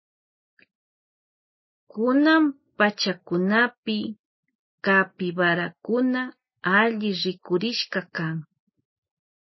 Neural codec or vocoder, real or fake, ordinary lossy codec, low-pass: none; real; MP3, 24 kbps; 7.2 kHz